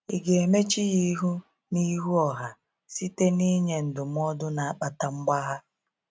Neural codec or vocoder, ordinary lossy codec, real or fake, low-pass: none; none; real; none